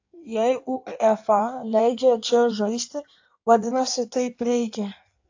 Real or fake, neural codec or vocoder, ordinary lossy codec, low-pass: fake; codec, 16 kHz in and 24 kHz out, 1.1 kbps, FireRedTTS-2 codec; AAC, 48 kbps; 7.2 kHz